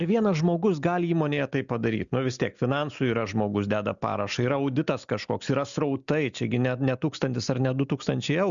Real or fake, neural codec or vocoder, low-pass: real; none; 7.2 kHz